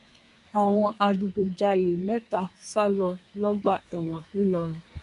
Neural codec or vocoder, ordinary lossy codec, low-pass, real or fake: codec, 24 kHz, 1 kbps, SNAC; none; 10.8 kHz; fake